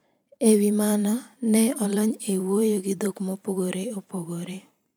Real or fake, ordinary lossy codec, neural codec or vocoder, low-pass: fake; none; vocoder, 44.1 kHz, 128 mel bands every 512 samples, BigVGAN v2; none